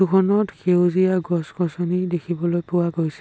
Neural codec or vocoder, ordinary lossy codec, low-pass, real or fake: none; none; none; real